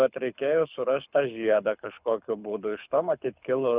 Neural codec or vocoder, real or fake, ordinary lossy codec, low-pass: codec, 24 kHz, 6 kbps, HILCodec; fake; Opus, 64 kbps; 3.6 kHz